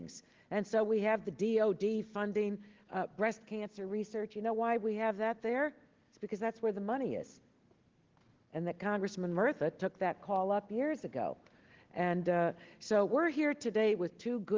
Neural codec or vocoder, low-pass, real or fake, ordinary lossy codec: none; 7.2 kHz; real; Opus, 16 kbps